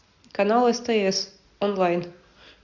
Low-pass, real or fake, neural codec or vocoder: 7.2 kHz; real; none